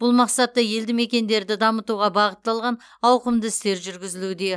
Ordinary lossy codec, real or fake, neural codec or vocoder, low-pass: none; real; none; none